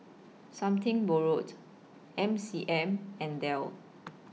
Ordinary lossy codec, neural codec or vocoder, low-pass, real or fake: none; none; none; real